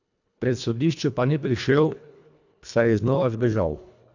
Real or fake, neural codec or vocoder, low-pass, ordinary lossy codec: fake; codec, 24 kHz, 1.5 kbps, HILCodec; 7.2 kHz; none